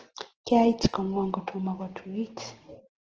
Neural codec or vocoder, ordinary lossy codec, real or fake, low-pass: none; Opus, 16 kbps; real; 7.2 kHz